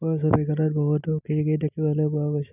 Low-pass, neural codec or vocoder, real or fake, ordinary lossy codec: 3.6 kHz; none; real; none